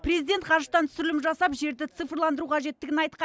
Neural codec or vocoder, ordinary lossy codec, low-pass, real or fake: none; none; none; real